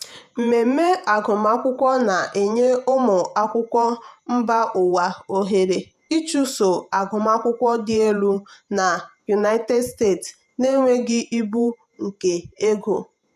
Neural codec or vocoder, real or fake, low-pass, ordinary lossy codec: vocoder, 48 kHz, 128 mel bands, Vocos; fake; 14.4 kHz; none